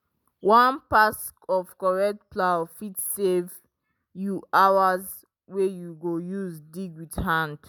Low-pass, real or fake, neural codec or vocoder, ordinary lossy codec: none; real; none; none